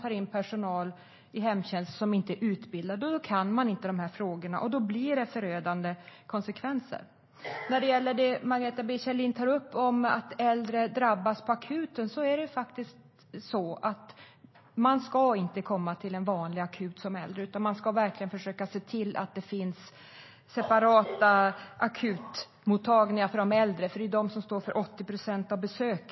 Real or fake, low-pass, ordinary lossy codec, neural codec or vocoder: real; 7.2 kHz; MP3, 24 kbps; none